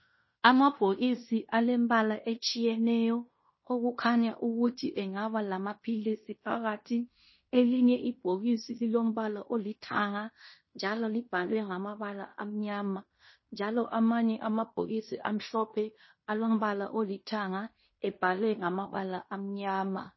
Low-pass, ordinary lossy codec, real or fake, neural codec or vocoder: 7.2 kHz; MP3, 24 kbps; fake; codec, 16 kHz in and 24 kHz out, 0.9 kbps, LongCat-Audio-Codec, fine tuned four codebook decoder